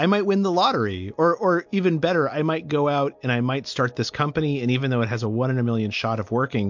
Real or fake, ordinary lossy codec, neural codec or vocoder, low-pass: real; MP3, 48 kbps; none; 7.2 kHz